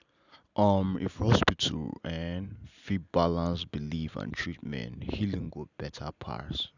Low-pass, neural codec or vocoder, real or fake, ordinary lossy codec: 7.2 kHz; none; real; none